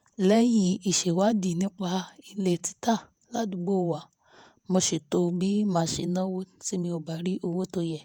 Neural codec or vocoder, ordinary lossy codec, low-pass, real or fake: vocoder, 48 kHz, 128 mel bands, Vocos; none; none; fake